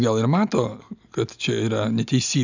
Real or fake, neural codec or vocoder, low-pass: real; none; 7.2 kHz